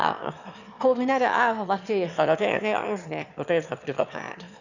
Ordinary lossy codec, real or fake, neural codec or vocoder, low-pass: none; fake; autoencoder, 22.05 kHz, a latent of 192 numbers a frame, VITS, trained on one speaker; 7.2 kHz